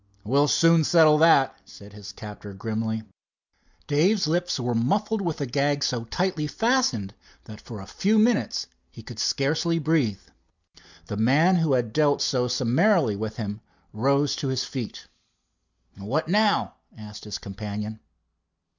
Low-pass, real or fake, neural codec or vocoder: 7.2 kHz; real; none